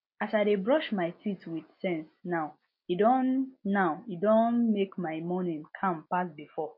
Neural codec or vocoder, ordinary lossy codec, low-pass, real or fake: none; MP3, 32 kbps; 5.4 kHz; real